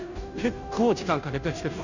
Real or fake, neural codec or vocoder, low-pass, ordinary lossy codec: fake; codec, 16 kHz, 0.5 kbps, FunCodec, trained on Chinese and English, 25 frames a second; 7.2 kHz; none